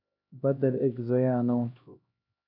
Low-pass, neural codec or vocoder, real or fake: 5.4 kHz; codec, 16 kHz, 1 kbps, X-Codec, HuBERT features, trained on LibriSpeech; fake